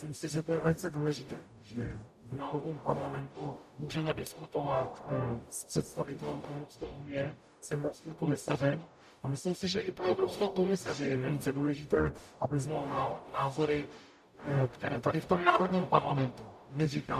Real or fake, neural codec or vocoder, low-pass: fake; codec, 44.1 kHz, 0.9 kbps, DAC; 14.4 kHz